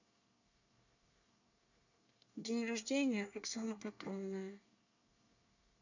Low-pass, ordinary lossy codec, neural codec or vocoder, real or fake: 7.2 kHz; none; codec, 24 kHz, 1 kbps, SNAC; fake